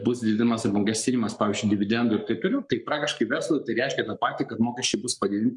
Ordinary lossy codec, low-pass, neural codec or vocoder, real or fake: MP3, 96 kbps; 10.8 kHz; codec, 44.1 kHz, 7.8 kbps, Pupu-Codec; fake